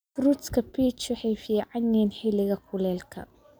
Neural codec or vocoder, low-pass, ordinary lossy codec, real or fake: none; none; none; real